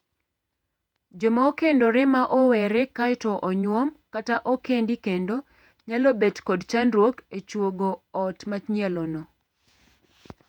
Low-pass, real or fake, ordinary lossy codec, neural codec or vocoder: 19.8 kHz; fake; MP3, 96 kbps; vocoder, 48 kHz, 128 mel bands, Vocos